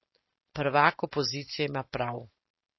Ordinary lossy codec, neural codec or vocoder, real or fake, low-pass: MP3, 24 kbps; none; real; 7.2 kHz